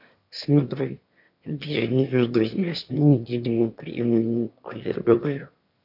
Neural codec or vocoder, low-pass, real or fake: autoencoder, 22.05 kHz, a latent of 192 numbers a frame, VITS, trained on one speaker; 5.4 kHz; fake